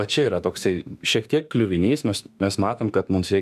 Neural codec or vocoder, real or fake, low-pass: autoencoder, 48 kHz, 32 numbers a frame, DAC-VAE, trained on Japanese speech; fake; 14.4 kHz